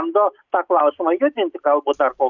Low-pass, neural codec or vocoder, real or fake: 7.2 kHz; none; real